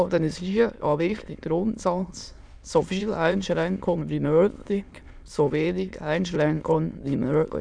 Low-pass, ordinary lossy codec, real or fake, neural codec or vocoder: 9.9 kHz; none; fake; autoencoder, 22.05 kHz, a latent of 192 numbers a frame, VITS, trained on many speakers